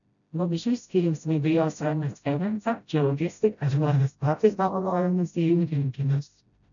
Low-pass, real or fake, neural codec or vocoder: 7.2 kHz; fake; codec, 16 kHz, 0.5 kbps, FreqCodec, smaller model